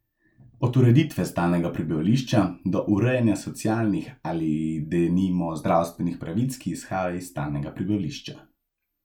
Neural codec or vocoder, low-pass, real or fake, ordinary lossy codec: none; 19.8 kHz; real; none